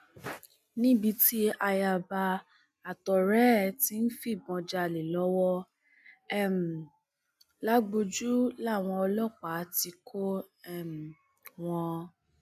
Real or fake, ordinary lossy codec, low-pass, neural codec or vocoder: real; none; 14.4 kHz; none